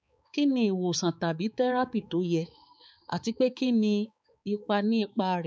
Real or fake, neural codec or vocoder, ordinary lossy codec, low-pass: fake; codec, 16 kHz, 4 kbps, X-Codec, HuBERT features, trained on balanced general audio; none; none